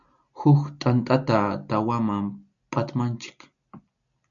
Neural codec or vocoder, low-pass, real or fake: none; 7.2 kHz; real